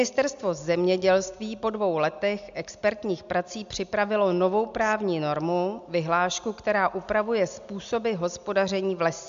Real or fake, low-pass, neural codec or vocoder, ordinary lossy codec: real; 7.2 kHz; none; MP3, 64 kbps